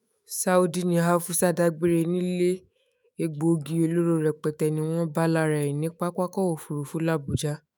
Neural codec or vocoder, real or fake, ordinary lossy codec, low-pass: autoencoder, 48 kHz, 128 numbers a frame, DAC-VAE, trained on Japanese speech; fake; none; none